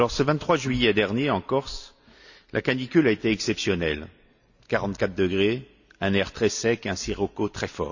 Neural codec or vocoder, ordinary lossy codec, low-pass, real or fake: none; none; 7.2 kHz; real